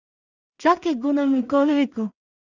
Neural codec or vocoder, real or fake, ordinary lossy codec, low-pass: codec, 16 kHz in and 24 kHz out, 0.4 kbps, LongCat-Audio-Codec, two codebook decoder; fake; Opus, 64 kbps; 7.2 kHz